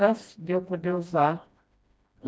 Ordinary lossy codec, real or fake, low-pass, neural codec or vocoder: none; fake; none; codec, 16 kHz, 1 kbps, FreqCodec, smaller model